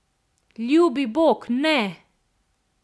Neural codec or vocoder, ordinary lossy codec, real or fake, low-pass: none; none; real; none